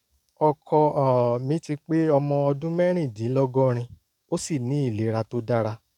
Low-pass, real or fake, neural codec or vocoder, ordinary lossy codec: 19.8 kHz; fake; codec, 44.1 kHz, 7.8 kbps, DAC; none